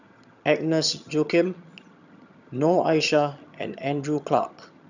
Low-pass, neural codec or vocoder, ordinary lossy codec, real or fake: 7.2 kHz; vocoder, 22.05 kHz, 80 mel bands, HiFi-GAN; none; fake